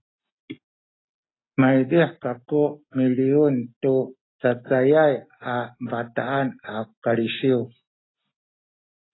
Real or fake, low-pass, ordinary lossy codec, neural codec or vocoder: real; 7.2 kHz; AAC, 16 kbps; none